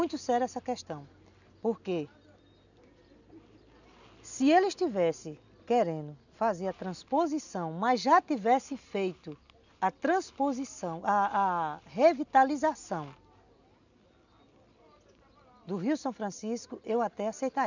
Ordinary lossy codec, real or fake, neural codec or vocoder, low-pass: none; real; none; 7.2 kHz